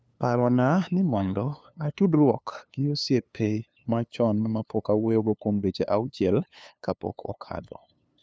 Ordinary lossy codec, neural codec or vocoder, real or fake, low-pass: none; codec, 16 kHz, 2 kbps, FunCodec, trained on LibriTTS, 25 frames a second; fake; none